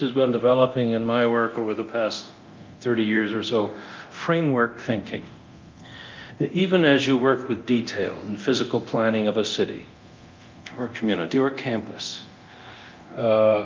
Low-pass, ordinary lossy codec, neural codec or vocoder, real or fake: 7.2 kHz; Opus, 24 kbps; codec, 24 kHz, 0.9 kbps, DualCodec; fake